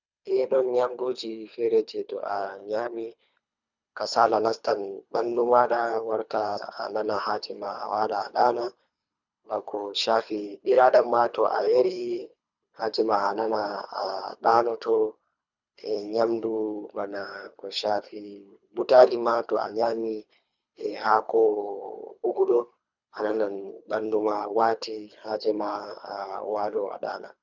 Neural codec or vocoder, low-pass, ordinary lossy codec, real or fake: codec, 24 kHz, 3 kbps, HILCodec; 7.2 kHz; none; fake